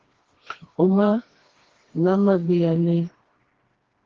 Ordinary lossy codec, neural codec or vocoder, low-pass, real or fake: Opus, 16 kbps; codec, 16 kHz, 2 kbps, FreqCodec, smaller model; 7.2 kHz; fake